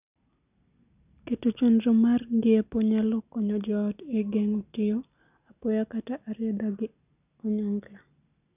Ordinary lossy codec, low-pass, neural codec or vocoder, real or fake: none; 3.6 kHz; none; real